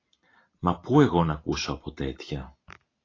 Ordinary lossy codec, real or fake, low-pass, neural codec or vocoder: AAC, 32 kbps; real; 7.2 kHz; none